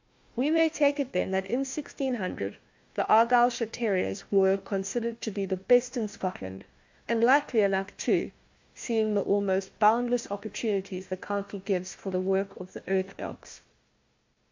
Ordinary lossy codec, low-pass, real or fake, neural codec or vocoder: MP3, 48 kbps; 7.2 kHz; fake; codec, 16 kHz, 1 kbps, FunCodec, trained on Chinese and English, 50 frames a second